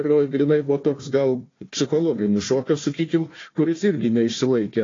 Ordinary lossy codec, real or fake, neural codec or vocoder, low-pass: AAC, 32 kbps; fake; codec, 16 kHz, 1 kbps, FunCodec, trained on Chinese and English, 50 frames a second; 7.2 kHz